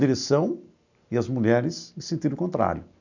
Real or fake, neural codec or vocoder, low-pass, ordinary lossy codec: real; none; 7.2 kHz; none